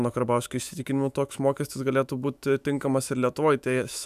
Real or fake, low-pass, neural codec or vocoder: fake; 14.4 kHz; autoencoder, 48 kHz, 128 numbers a frame, DAC-VAE, trained on Japanese speech